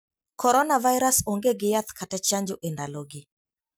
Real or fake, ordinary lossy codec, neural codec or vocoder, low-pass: real; none; none; none